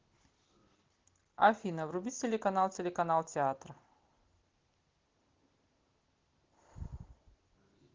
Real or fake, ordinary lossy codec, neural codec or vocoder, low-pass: real; Opus, 16 kbps; none; 7.2 kHz